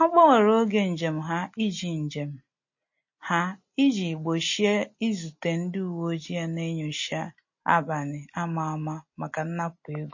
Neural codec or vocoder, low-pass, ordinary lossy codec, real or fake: none; 7.2 kHz; MP3, 32 kbps; real